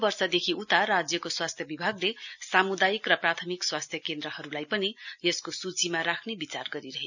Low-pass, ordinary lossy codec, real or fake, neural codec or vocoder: 7.2 kHz; none; real; none